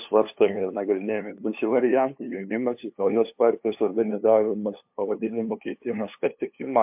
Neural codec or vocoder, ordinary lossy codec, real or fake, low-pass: codec, 16 kHz, 2 kbps, FunCodec, trained on LibriTTS, 25 frames a second; MP3, 32 kbps; fake; 3.6 kHz